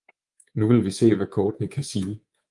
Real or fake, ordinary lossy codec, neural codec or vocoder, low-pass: fake; Opus, 32 kbps; codec, 24 kHz, 3.1 kbps, DualCodec; 10.8 kHz